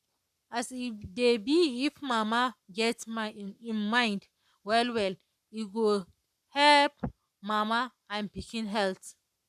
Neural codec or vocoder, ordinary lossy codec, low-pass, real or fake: codec, 44.1 kHz, 7.8 kbps, Pupu-Codec; none; 14.4 kHz; fake